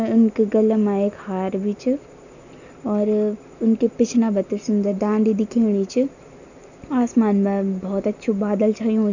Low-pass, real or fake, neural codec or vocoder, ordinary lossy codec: 7.2 kHz; real; none; none